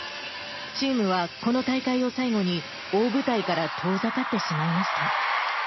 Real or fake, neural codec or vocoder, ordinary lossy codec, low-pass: real; none; MP3, 24 kbps; 7.2 kHz